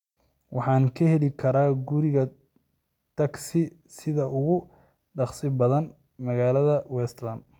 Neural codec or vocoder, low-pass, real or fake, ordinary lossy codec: none; 19.8 kHz; real; none